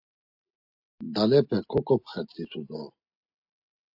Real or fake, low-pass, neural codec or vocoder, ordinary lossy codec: real; 5.4 kHz; none; AAC, 48 kbps